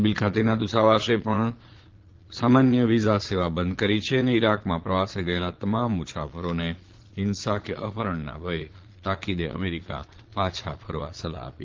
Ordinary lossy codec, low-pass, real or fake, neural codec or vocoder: Opus, 16 kbps; 7.2 kHz; fake; vocoder, 22.05 kHz, 80 mel bands, Vocos